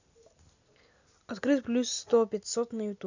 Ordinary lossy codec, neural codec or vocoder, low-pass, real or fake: none; none; 7.2 kHz; real